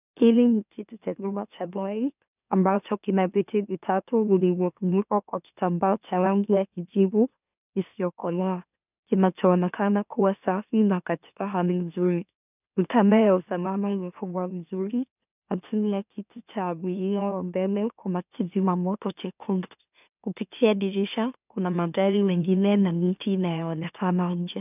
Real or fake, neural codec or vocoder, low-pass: fake; autoencoder, 44.1 kHz, a latent of 192 numbers a frame, MeloTTS; 3.6 kHz